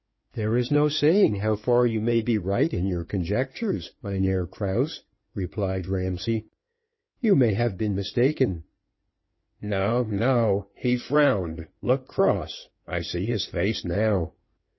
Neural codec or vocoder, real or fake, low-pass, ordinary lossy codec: codec, 16 kHz in and 24 kHz out, 2.2 kbps, FireRedTTS-2 codec; fake; 7.2 kHz; MP3, 24 kbps